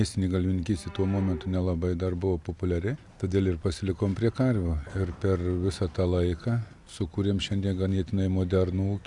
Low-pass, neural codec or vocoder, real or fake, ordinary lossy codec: 10.8 kHz; none; real; Opus, 64 kbps